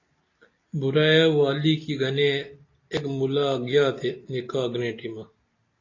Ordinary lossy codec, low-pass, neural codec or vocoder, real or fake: AAC, 32 kbps; 7.2 kHz; none; real